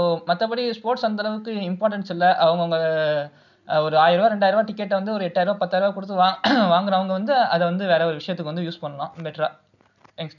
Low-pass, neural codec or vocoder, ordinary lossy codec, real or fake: 7.2 kHz; none; none; real